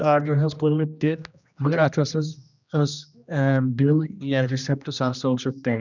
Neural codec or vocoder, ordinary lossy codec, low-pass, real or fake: codec, 16 kHz, 1 kbps, X-Codec, HuBERT features, trained on general audio; none; 7.2 kHz; fake